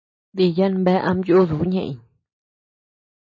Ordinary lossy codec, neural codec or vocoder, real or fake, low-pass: MP3, 32 kbps; none; real; 7.2 kHz